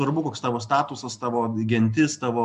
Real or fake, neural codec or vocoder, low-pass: real; none; 10.8 kHz